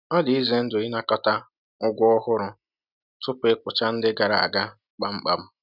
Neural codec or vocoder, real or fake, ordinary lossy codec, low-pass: none; real; none; 5.4 kHz